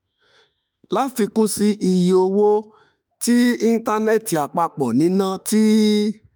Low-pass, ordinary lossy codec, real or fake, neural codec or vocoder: none; none; fake; autoencoder, 48 kHz, 32 numbers a frame, DAC-VAE, trained on Japanese speech